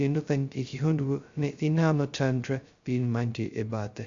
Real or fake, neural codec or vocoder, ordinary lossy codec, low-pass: fake; codec, 16 kHz, 0.2 kbps, FocalCodec; Opus, 64 kbps; 7.2 kHz